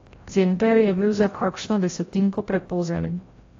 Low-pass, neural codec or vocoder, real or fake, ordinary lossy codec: 7.2 kHz; codec, 16 kHz, 0.5 kbps, FreqCodec, larger model; fake; AAC, 32 kbps